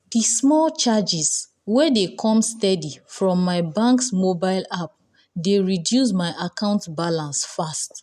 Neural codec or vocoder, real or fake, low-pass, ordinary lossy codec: none; real; 14.4 kHz; none